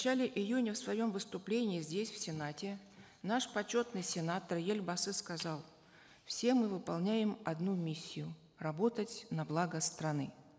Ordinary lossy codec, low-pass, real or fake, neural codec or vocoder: none; none; real; none